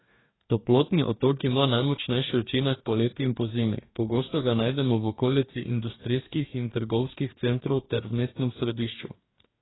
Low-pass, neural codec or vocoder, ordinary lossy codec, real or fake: 7.2 kHz; codec, 44.1 kHz, 2.6 kbps, DAC; AAC, 16 kbps; fake